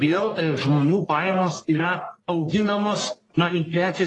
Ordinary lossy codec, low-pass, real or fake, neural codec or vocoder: AAC, 32 kbps; 10.8 kHz; fake; codec, 44.1 kHz, 1.7 kbps, Pupu-Codec